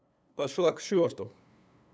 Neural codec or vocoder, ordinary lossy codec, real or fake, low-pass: codec, 16 kHz, 2 kbps, FunCodec, trained on LibriTTS, 25 frames a second; none; fake; none